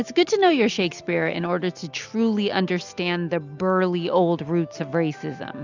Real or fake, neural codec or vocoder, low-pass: real; none; 7.2 kHz